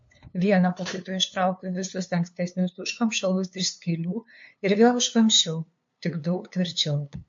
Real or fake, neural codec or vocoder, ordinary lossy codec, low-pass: fake; codec, 16 kHz, 2 kbps, FunCodec, trained on LibriTTS, 25 frames a second; MP3, 48 kbps; 7.2 kHz